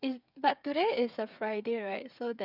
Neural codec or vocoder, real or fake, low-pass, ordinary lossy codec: codec, 16 kHz, 8 kbps, FreqCodec, smaller model; fake; 5.4 kHz; none